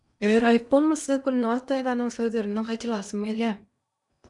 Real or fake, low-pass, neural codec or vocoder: fake; 10.8 kHz; codec, 16 kHz in and 24 kHz out, 0.8 kbps, FocalCodec, streaming, 65536 codes